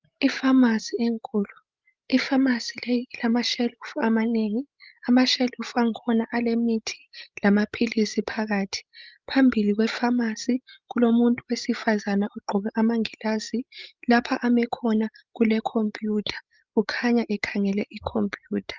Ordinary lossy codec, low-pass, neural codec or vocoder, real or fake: Opus, 32 kbps; 7.2 kHz; codec, 16 kHz, 16 kbps, FreqCodec, larger model; fake